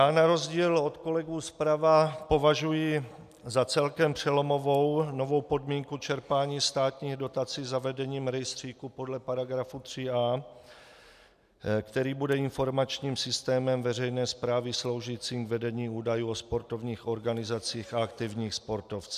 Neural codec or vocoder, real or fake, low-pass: none; real; 14.4 kHz